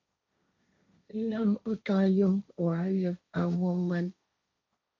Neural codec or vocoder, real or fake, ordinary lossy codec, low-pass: codec, 16 kHz, 1.1 kbps, Voila-Tokenizer; fake; MP3, 48 kbps; 7.2 kHz